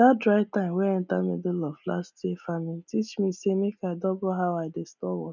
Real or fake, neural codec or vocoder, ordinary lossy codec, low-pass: real; none; none; 7.2 kHz